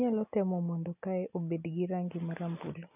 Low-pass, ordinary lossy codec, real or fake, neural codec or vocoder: 3.6 kHz; none; real; none